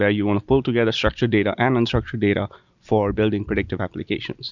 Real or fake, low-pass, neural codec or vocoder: real; 7.2 kHz; none